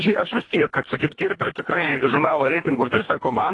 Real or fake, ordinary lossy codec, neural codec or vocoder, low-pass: fake; AAC, 32 kbps; codec, 24 kHz, 1.5 kbps, HILCodec; 10.8 kHz